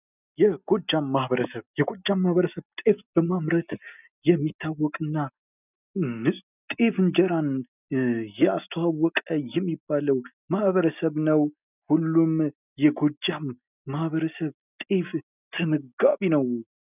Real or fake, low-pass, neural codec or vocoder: real; 3.6 kHz; none